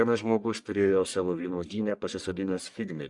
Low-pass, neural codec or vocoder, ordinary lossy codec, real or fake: 10.8 kHz; codec, 44.1 kHz, 1.7 kbps, Pupu-Codec; Opus, 64 kbps; fake